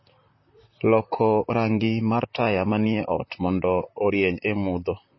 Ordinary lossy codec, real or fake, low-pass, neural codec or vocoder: MP3, 24 kbps; fake; 7.2 kHz; vocoder, 44.1 kHz, 128 mel bands, Pupu-Vocoder